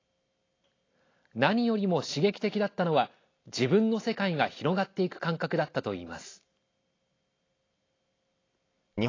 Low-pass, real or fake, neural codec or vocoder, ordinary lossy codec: 7.2 kHz; real; none; AAC, 32 kbps